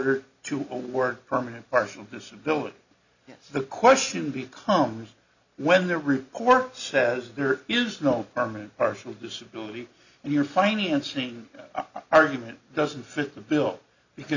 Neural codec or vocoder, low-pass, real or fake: none; 7.2 kHz; real